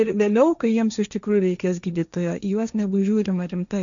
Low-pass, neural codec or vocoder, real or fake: 7.2 kHz; codec, 16 kHz, 1.1 kbps, Voila-Tokenizer; fake